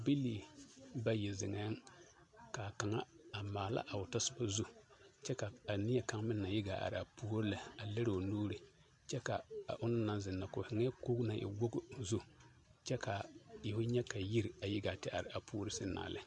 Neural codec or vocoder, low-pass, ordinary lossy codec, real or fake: none; 10.8 kHz; MP3, 64 kbps; real